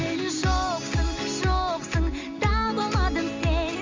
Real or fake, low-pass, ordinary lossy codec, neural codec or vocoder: real; 7.2 kHz; MP3, 48 kbps; none